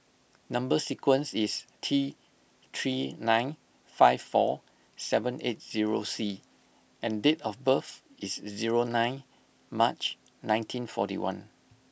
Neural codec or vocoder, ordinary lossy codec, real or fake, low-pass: none; none; real; none